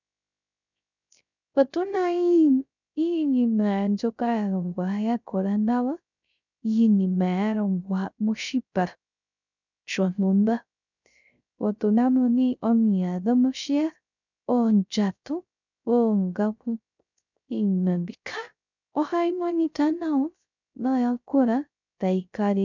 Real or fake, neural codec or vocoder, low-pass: fake; codec, 16 kHz, 0.3 kbps, FocalCodec; 7.2 kHz